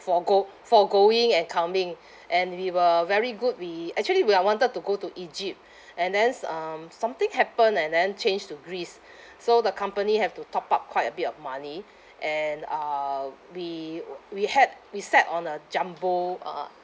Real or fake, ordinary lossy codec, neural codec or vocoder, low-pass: real; none; none; none